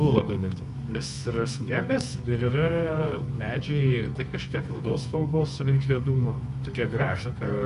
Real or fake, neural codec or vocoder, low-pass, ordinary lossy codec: fake; codec, 24 kHz, 0.9 kbps, WavTokenizer, medium music audio release; 10.8 kHz; MP3, 64 kbps